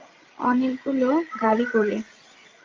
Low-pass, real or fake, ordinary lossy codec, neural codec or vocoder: 7.2 kHz; fake; Opus, 32 kbps; codec, 16 kHz, 16 kbps, FreqCodec, larger model